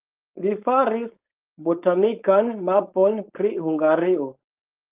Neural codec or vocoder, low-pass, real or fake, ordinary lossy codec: codec, 16 kHz, 4.8 kbps, FACodec; 3.6 kHz; fake; Opus, 32 kbps